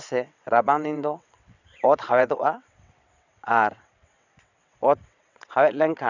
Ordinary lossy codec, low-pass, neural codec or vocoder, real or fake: none; 7.2 kHz; vocoder, 22.05 kHz, 80 mel bands, WaveNeXt; fake